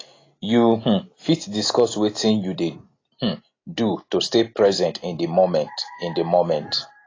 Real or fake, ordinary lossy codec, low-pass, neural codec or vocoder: real; AAC, 32 kbps; 7.2 kHz; none